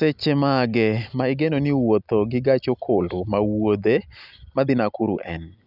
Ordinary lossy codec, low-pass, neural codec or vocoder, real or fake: none; 5.4 kHz; none; real